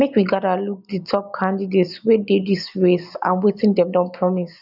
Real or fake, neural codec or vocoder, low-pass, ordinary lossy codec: real; none; 5.4 kHz; none